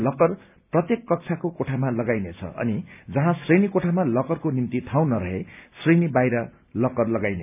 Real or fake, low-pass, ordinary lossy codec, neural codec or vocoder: real; 3.6 kHz; none; none